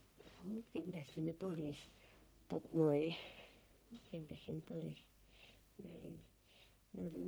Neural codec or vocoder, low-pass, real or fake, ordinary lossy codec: codec, 44.1 kHz, 1.7 kbps, Pupu-Codec; none; fake; none